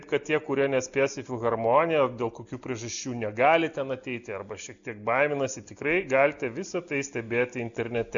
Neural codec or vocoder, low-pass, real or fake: none; 7.2 kHz; real